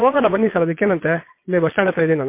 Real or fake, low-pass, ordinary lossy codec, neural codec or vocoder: fake; 3.6 kHz; MP3, 24 kbps; vocoder, 22.05 kHz, 80 mel bands, Vocos